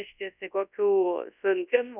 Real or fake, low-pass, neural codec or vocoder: fake; 3.6 kHz; codec, 24 kHz, 0.9 kbps, WavTokenizer, large speech release